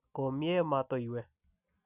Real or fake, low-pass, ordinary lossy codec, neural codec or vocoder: real; 3.6 kHz; none; none